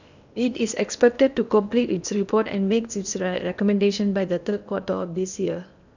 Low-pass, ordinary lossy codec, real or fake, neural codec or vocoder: 7.2 kHz; none; fake; codec, 16 kHz in and 24 kHz out, 0.8 kbps, FocalCodec, streaming, 65536 codes